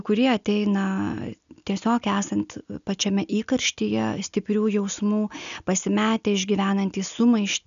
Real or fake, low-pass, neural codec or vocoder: real; 7.2 kHz; none